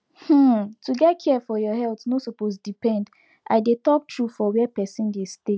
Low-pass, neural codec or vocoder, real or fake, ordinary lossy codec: none; none; real; none